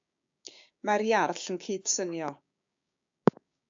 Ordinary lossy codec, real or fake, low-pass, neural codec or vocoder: AAC, 64 kbps; fake; 7.2 kHz; codec, 16 kHz, 6 kbps, DAC